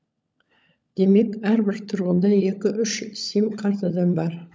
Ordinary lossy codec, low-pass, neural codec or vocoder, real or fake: none; none; codec, 16 kHz, 16 kbps, FunCodec, trained on LibriTTS, 50 frames a second; fake